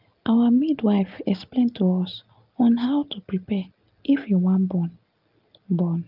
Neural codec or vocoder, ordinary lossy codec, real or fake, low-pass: none; Opus, 32 kbps; real; 5.4 kHz